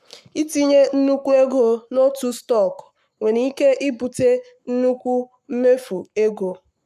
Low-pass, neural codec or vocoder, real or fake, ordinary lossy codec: 14.4 kHz; codec, 44.1 kHz, 7.8 kbps, Pupu-Codec; fake; none